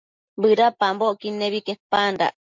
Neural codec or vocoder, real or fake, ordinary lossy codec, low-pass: none; real; MP3, 64 kbps; 7.2 kHz